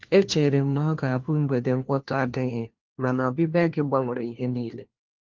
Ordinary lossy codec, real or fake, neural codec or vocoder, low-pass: Opus, 16 kbps; fake; codec, 16 kHz, 1 kbps, FunCodec, trained on LibriTTS, 50 frames a second; 7.2 kHz